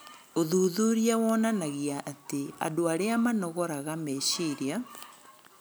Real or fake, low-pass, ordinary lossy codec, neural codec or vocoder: real; none; none; none